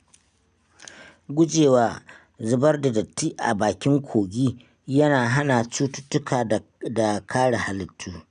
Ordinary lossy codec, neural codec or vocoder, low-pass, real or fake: none; none; 9.9 kHz; real